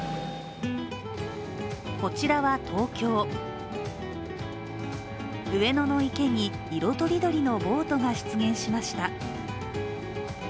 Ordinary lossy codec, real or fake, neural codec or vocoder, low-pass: none; real; none; none